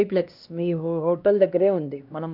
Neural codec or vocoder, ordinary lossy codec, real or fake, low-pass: codec, 16 kHz, 1 kbps, X-Codec, HuBERT features, trained on LibriSpeech; none; fake; 5.4 kHz